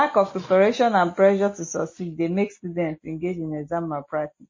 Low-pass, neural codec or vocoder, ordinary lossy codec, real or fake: 7.2 kHz; none; MP3, 32 kbps; real